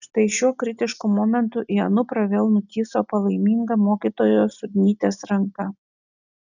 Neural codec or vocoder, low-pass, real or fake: none; 7.2 kHz; real